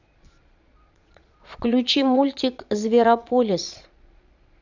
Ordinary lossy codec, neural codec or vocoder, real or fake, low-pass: none; vocoder, 22.05 kHz, 80 mel bands, Vocos; fake; 7.2 kHz